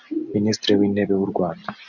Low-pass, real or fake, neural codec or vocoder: 7.2 kHz; real; none